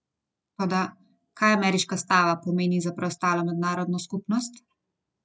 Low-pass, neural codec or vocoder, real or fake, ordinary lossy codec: none; none; real; none